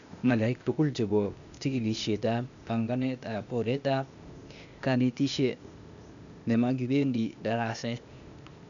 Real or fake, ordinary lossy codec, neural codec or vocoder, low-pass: fake; none; codec, 16 kHz, 0.8 kbps, ZipCodec; 7.2 kHz